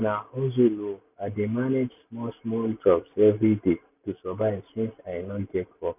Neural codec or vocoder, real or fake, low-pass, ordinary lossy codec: none; real; 3.6 kHz; none